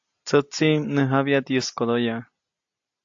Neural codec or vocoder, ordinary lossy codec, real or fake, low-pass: none; AAC, 64 kbps; real; 7.2 kHz